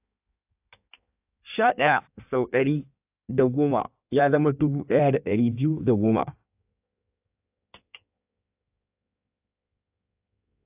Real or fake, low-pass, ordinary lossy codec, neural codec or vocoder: fake; 3.6 kHz; AAC, 32 kbps; codec, 16 kHz in and 24 kHz out, 1.1 kbps, FireRedTTS-2 codec